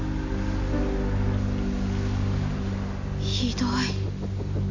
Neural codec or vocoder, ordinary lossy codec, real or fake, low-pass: none; AAC, 48 kbps; real; 7.2 kHz